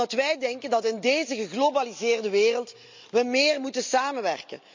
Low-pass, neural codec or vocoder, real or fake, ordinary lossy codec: 7.2 kHz; none; real; MP3, 64 kbps